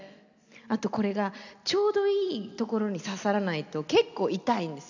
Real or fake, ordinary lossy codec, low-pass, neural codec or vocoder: real; none; 7.2 kHz; none